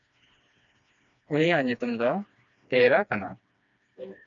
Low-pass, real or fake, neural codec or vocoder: 7.2 kHz; fake; codec, 16 kHz, 2 kbps, FreqCodec, smaller model